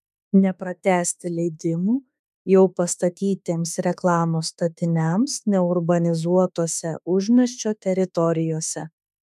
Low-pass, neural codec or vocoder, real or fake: 14.4 kHz; autoencoder, 48 kHz, 32 numbers a frame, DAC-VAE, trained on Japanese speech; fake